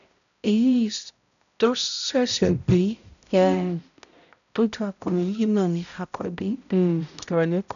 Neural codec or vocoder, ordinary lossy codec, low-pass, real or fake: codec, 16 kHz, 0.5 kbps, X-Codec, HuBERT features, trained on balanced general audio; none; 7.2 kHz; fake